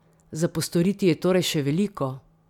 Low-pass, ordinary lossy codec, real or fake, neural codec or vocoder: 19.8 kHz; none; real; none